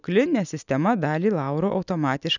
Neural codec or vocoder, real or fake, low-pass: none; real; 7.2 kHz